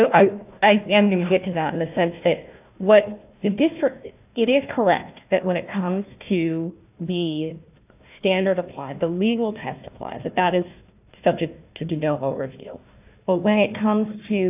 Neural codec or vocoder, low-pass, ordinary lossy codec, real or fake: codec, 16 kHz, 1 kbps, FunCodec, trained on Chinese and English, 50 frames a second; 3.6 kHz; AAC, 32 kbps; fake